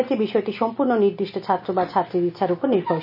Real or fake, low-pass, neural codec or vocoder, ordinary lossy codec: real; 5.4 kHz; none; none